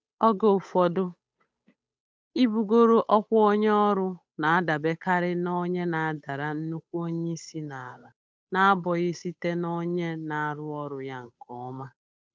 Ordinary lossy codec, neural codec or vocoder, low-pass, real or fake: none; codec, 16 kHz, 8 kbps, FunCodec, trained on Chinese and English, 25 frames a second; none; fake